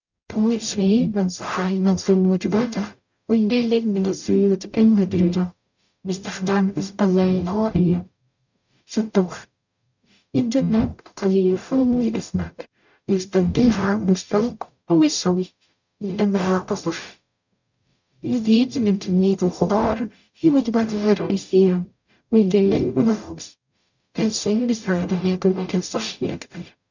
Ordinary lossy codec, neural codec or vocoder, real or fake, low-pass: none; codec, 44.1 kHz, 0.9 kbps, DAC; fake; 7.2 kHz